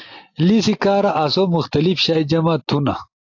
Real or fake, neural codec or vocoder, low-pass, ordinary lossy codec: real; none; 7.2 kHz; AAC, 48 kbps